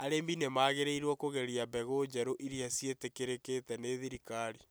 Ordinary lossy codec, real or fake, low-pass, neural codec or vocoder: none; real; none; none